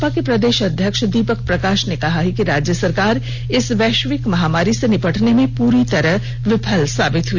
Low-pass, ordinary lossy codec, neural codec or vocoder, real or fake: 7.2 kHz; none; none; real